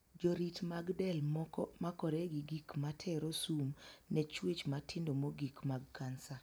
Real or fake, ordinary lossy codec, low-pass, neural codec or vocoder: real; none; none; none